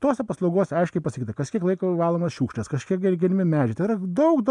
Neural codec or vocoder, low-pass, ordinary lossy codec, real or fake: none; 10.8 kHz; MP3, 96 kbps; real